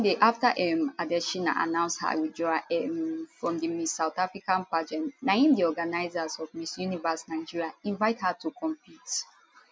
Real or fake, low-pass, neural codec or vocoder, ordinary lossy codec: real; none; none; none